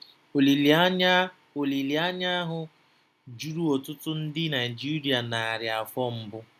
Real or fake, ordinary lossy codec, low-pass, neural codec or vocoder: real; none; 14.4 kHz; none